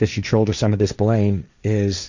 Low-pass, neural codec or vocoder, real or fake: 7.2 kHz; codec, 16 kHz, 1.1 kbps, Voila-Tokenizer; fake